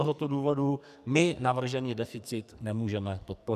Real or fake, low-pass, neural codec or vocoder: fake; 14.4 kHz; codec, 44.1 kHz, 2.6 kbps, SNAC